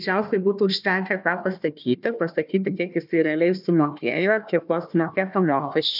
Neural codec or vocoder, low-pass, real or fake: codec, 16 kHz, 1 kbps, FunCodec, trained on Chinese and English, 50 frames a second; 5.4 kHz; fake